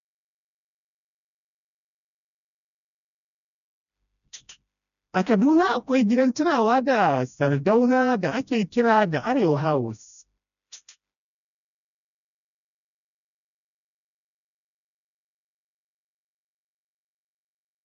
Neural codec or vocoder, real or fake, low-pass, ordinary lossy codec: codec, 16 kHz, 1 kbps, FreqCodec, smaller model; fake; 7.2 kHz; none